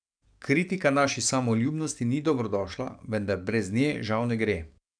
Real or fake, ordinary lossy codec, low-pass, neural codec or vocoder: fake; none; 9.9 kHz; codec, 44.1 kHz, 7.8 kbps, DAC